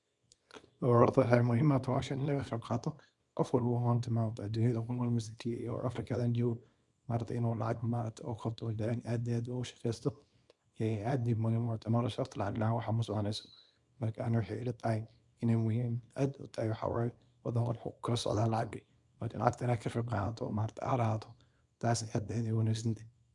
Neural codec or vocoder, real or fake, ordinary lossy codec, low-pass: codec, 24 kHz, 0.9 kbps, WavTokenizer, small release; fake; none; 10.8 kHz